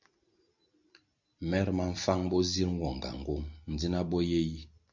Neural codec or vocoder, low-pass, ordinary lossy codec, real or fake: none; 7.2 kHz; MP3, 48 kbps; real